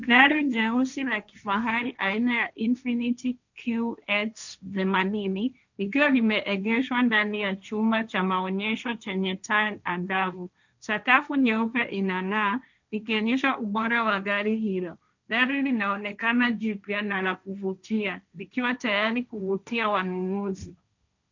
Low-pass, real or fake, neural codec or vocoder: 7.2 kHz; fake; codec, 16 kHz, 1.1 kbps, Voila-Tokenizer